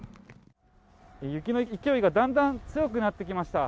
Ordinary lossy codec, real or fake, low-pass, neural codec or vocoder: none; real; none; none